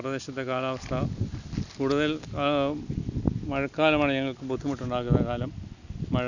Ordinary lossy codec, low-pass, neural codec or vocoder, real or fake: none; 7.2 kHz; none; real